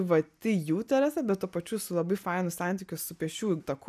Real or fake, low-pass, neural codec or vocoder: real; 14.4 kHz; none